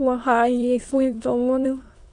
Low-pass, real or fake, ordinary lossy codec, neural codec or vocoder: 9.9 kHz; fake; AAC, 48 kbps; autoencoder, 22.05 kHz, a latent of 192 numbers a frame, VITS, trained on many speakers